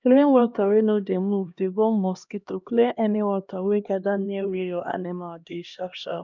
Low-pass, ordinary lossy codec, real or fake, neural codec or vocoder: 7.2 kHz; none; fake; codec, 16 kHz, 1 kbps, X-Codec, HuBERT features, trained on LibriSpeech